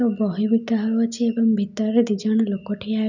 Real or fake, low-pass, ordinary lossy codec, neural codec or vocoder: real; 7.2 kHz; none; none